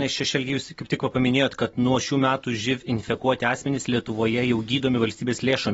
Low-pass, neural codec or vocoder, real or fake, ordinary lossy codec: 19.8 kHz; none; real; AAC, 24 kbps